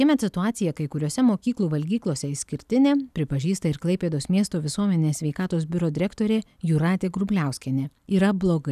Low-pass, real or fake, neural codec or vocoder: 14.4 kHz; real; none